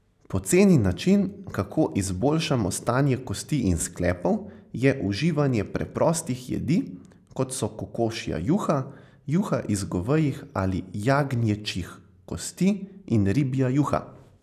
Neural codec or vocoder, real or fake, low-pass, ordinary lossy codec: none; real; 14.4 kHz; none